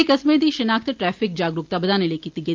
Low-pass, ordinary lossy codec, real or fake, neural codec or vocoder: 7.2 kHz; Opus, 24 kbps; real; none